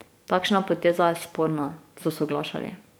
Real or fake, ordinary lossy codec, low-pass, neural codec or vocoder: fake; none; none; codec, 44.1 kHz, 7.8 kbps, DAC